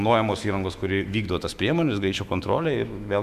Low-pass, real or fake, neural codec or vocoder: 14.4 kHz; fake; autoencoder, 48 kHz, 128 numbers a frame, DAC-VAE, trained on Japanese speech